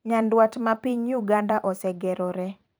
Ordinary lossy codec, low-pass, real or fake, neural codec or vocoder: none; none; fake; vocoder, 44.1 kHz, 128 mel bands every 512 samples, BigVGAN v2